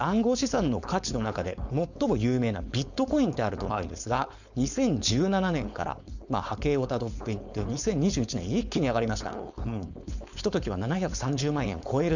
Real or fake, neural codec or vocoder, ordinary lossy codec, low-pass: fake; codec, 16 kHz, 4.8 kbps, FACodec; none; 7.2 kHz